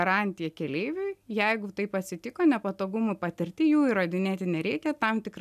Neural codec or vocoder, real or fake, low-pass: none; real; 14.4 kHz